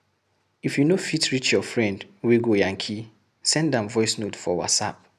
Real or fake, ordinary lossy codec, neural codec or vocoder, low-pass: real; none; none; 14.4 kHz